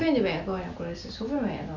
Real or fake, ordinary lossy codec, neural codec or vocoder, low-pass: real; none; none; 7.2 kHz